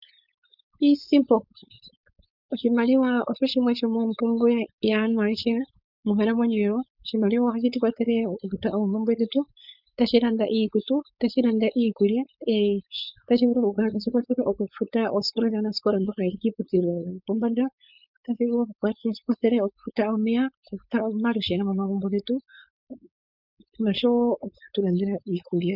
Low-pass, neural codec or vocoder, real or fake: 5.4 kHz; codec, 16 kHz, 4.8 kbps, FACodec; fake